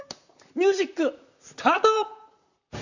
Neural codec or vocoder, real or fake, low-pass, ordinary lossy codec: vocoder, 44.1 kHz, 128 mel bands, Pupu-Vocoder; fake; 7.2 kHz; none